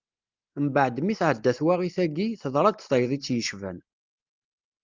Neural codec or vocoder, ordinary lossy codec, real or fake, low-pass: none; Opus, 24 kbps; real; 7.2 kHz